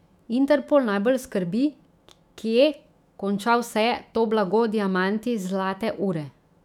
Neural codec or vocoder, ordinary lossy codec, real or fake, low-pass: autoencoder, 48 kHz, 128 numbers a frame, DAC-VAE, trained on Japanese speech; none; fake; 19.8 kHz